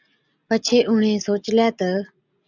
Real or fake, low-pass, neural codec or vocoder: real; 7.2 kHz; none